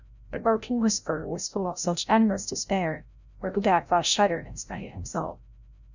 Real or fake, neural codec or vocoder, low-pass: fake; codec, 16 kHz, 0.5 kbps, FreqCodec, larger model; 7.2 kHz